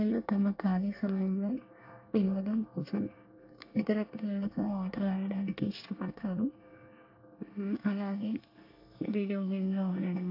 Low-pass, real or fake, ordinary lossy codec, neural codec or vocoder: 5.4 kHz; fake; AAC, 32 kbps; codec, 24 kHz, 1 kbps, SNAC